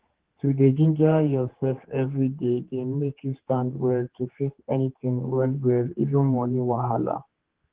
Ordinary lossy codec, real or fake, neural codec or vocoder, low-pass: Opus, 16 kbps; fake; codec, 16 kHz, 4 kbps, X-Codec, HuBERT features, trained on general audio; 3.6 kHz